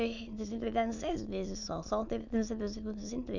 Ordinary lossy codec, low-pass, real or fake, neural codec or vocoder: none; 7.2 kHz; fake; autoencoder, 22.05 kHz, a latent of 192 numbers a frame, VITS, trained on many speakers